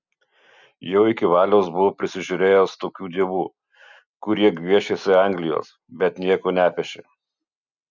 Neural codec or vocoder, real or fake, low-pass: none; real; 7.2 kHz